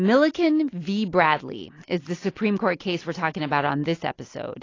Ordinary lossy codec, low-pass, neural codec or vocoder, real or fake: AAC, 32 kbps; 7.2 kHz; none; real